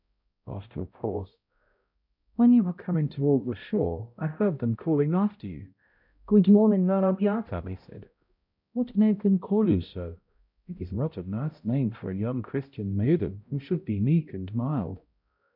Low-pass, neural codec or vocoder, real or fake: 5.4 kHz; codec, 16 kHz, 0.5 kbps, X-Codec, HuBERT features, trained on balanced general audio; fake